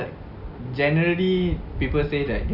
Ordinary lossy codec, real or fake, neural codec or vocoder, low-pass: none; real; none; 5.4 kHz